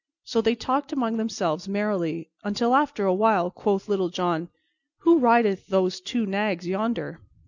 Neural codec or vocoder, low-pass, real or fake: none; 7.2 kHz; real